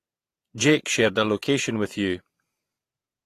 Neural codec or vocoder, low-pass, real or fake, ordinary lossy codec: vocoder, 48 kHz, 128 mel bands, Vocos; 14.4 kHz; fake; AAC, 48 kbps